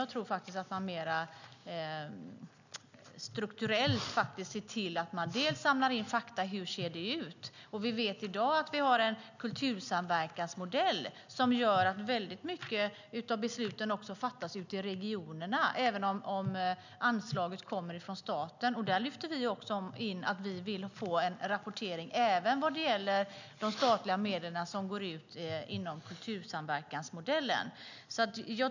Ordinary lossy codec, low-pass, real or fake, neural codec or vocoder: none; 7.2 kHz; real; none